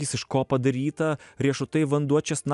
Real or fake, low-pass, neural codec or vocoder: real; 10.8 kHz; none